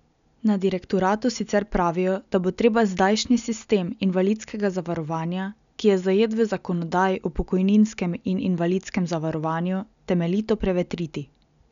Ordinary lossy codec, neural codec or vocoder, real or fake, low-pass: none; none; real; 7.2 kHz